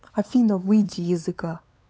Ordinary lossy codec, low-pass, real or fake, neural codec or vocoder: none; none; fake; codec, 16 kHz, 4 kbps, X-Codec, WavLM features, trained on Multilingual LibriSpeech